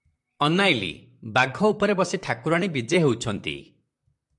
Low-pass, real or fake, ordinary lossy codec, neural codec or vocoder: 10.8 kHz; fake; MP3, 64 kbps; vocoder, 44.1 kHz, 128 mel bands, Pupu-Vocoder